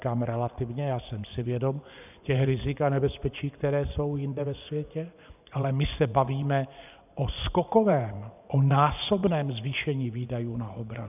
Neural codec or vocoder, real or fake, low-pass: vocoder, 24 kHz, 100 mel bands, Vocos; fake; 3.6 kHz